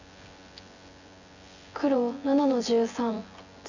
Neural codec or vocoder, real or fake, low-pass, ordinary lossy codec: vocoder, 24 kHz, 100 mel bands, Vocos; fake; 7.2 kHz; none